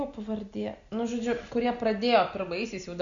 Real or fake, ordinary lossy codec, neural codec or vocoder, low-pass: real; Opus, 64 kbps; none; 7.2 kHz